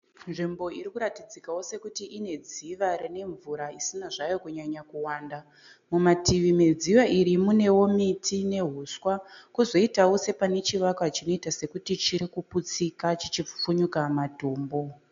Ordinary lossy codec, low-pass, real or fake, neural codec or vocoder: MP3, 64 kbps; 7.2 kHz; real; none